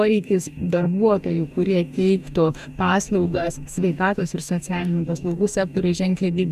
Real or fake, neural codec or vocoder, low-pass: fake; codec, 44.1 kHz, 2.6 kbps, DAC; 14.4 kHz